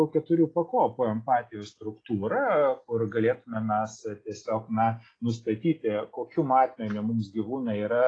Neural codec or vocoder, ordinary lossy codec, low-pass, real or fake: none; AAC, 32 kbps; 9.9 kHz; real